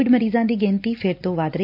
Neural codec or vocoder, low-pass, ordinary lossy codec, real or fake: none; 5.4 kHz; AAC, 48 kbps; real